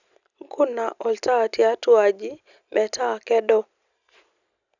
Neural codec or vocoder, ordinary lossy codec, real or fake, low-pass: none; none; real; 7.2 kHz